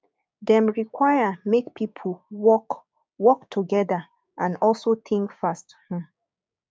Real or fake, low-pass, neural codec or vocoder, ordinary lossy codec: fake; none; codec, 16 kHz, 6 kbps, DAC; none